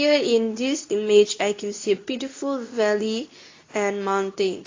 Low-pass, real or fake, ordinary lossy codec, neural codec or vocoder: 7.2 kHz; fake; AAC, 32 kbps; codec, 24 kHz, 0.9 kbps, WavTokenizer, medium speech release version 2